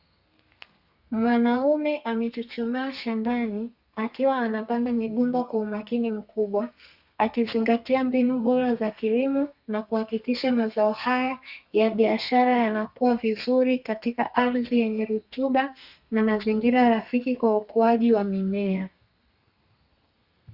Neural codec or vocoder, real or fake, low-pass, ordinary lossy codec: codec, 32 kHz, 1.9 kbps, SNAC; fake; 5.4 kHz; Opus, 64 kbps